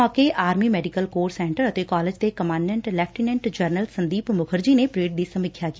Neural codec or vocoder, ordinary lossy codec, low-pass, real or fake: none; none; none; real